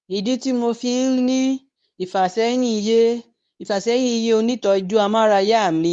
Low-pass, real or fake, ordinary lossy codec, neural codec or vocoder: 10.8 kHz; fake; none; codec, 24 kHz, 0.9 kbps, WavTokenizer, medium speech release version 2